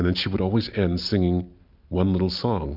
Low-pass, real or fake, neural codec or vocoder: 5.4 kHz; real; none